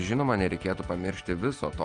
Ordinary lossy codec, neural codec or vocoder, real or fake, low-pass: Opus, 32 kbps; none; real; 9.9 kHz